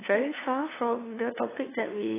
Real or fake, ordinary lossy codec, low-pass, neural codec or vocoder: fake; AAC, 16 kbps; 3.6 kHz; codec, 16 kHz, 2 kbps, FunCodec, trained on LibriTTS, 25 frames a second